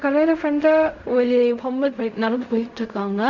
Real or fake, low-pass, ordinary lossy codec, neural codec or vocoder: fake; 7.2 kHz; none; codec, 16 kHz in and 24 kHz out, 0.4 kbps, LongCat-Audio-Codec, fine tuned four codebook decoder